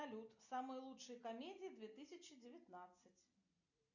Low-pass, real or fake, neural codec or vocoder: 7.2 kHz; real; none